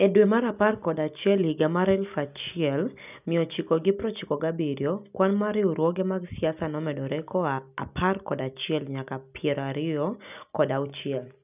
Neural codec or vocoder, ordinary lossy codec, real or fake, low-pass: none; none; real; 3.6 kHz